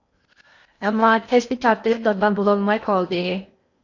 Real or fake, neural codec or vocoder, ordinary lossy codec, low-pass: fake; codec, 16 kHz in and 24 kHz out, 0.8 kbps, FocalCodec, streaming, 65536 codes; AAC, 48 kbps; 7.2 kHz